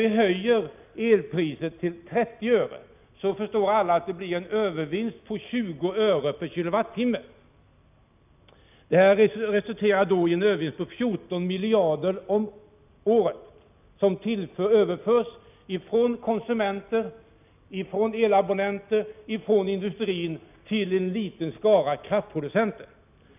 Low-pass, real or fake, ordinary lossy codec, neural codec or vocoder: 3.6 kHz; real; none; none